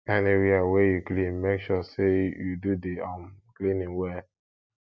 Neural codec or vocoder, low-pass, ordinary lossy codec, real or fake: none; none; none; real